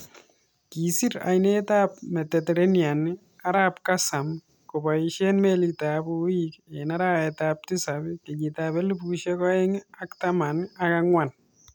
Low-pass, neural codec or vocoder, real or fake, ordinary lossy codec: none; none; real; none